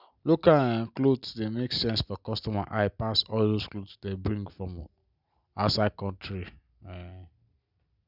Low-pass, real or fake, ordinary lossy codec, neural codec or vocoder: 5.4 kHz; real; none; none